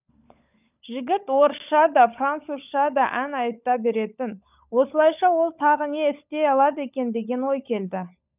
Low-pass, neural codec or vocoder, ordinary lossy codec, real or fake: 3.6 kHz; codec, 16 kHz, 16 kbps, FunCodec, trained on LibriTTS, 50 frames a second; none; fake